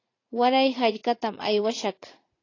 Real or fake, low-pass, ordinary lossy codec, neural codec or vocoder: fake; 7.2 kHz; AAC, 32 kbps; vocoder, 44.1 kHz, 80 mel bands, Vocos